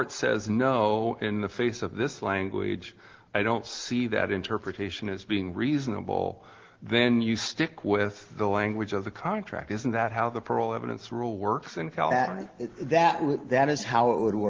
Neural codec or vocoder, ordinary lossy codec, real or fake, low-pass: none; Opus, 16 kbps; real; 7.2 kHz